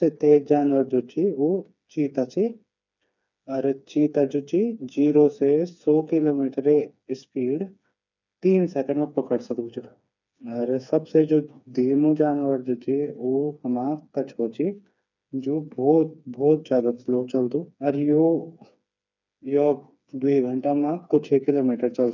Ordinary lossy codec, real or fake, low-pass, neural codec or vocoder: none; fake; 7.2 kHz; codec, 16 kHz, 4 kbps, FreqCodec, smaller model